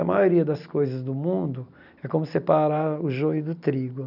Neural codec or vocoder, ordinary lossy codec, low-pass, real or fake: none; none; 5.4 kHz; real